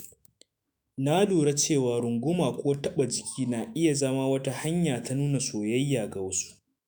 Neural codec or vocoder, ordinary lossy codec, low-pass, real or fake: autoencoder, 48 kHz, 128 numbers a frame, DAC-VAE, trained on Japanese speech; none; none; fake